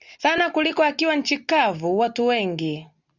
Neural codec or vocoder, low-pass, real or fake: none; 7.2 kHz; real